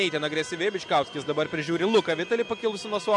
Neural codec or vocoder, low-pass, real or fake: none; 10.8 kHz; real